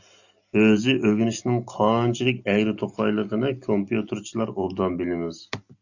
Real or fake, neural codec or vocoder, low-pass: real; none; 7.2 kHz